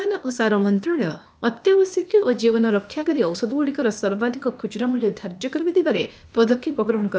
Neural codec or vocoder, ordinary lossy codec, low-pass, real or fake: codec, 16 kHz, 0.8 kbps, ZipCodec; none; none; fake